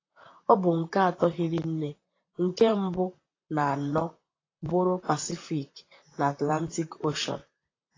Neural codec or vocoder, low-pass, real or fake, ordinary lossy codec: vocoder, 44.1 kHz, 128 mel bands, Pupu-Vocoder; 7.2 kHz; fake; AAC, 32 kbps